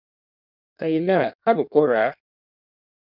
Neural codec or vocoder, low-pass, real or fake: codec, 16 kHz in and 24 kHz out, 1.1 kbps, FireRedTTS-2 codec; 5.4 kHz; fake